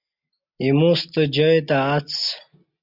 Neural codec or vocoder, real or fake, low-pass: none; real; 5.4 kHz